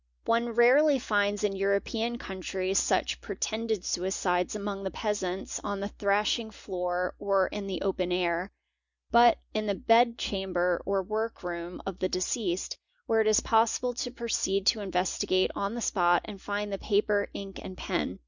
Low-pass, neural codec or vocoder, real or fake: 7.2 kHz; none; real